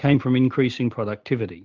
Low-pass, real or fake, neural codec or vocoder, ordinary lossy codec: 7.2 kHz; real; none; Opus, 24 kbps